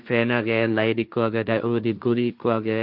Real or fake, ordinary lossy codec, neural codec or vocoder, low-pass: fake; none; codec, 16 kHz, 1.1 kbps, Voila-Tokenizer; 5.4 kHz